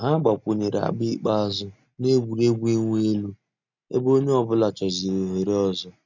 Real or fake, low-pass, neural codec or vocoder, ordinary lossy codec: real; 7.2 kHz; none; none